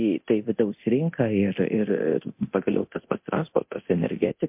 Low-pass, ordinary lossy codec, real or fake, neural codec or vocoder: 3.6 kHz; MP3, 32 kbps; fake; codec, 24 kHz, 0.9 kbps, DualCodec